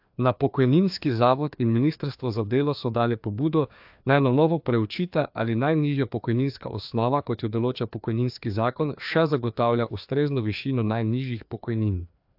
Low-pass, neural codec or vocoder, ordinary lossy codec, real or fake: 5.4 kHz; codec, 16 kHz, 2 kbps, FreqCodec, larger model; AAC, 48 kbps; fake